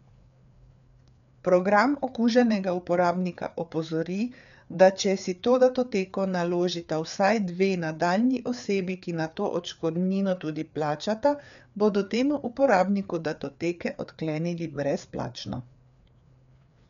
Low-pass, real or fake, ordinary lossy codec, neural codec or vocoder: 7.2 kHz; fake; none; codec, 16 kHz, 4 kbps, FreqCodec, larger model